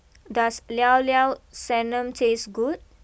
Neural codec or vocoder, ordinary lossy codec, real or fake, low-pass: none; none; real; none